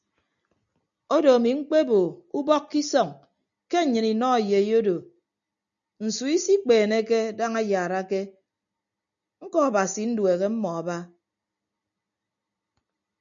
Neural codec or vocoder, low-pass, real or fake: none; 7.2 kHz; real